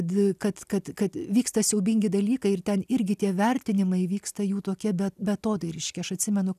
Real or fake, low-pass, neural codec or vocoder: real; 14.4 kHz; none